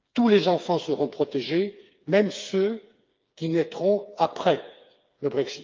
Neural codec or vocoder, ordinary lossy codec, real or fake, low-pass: codec, 16 kHz, 4 kbps, FreqCodec, smaller model; Opus, 24 kbps; fake; 7.2 kHz